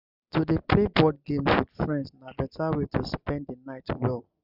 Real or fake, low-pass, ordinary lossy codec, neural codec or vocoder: real; 5.4 kHz; none; none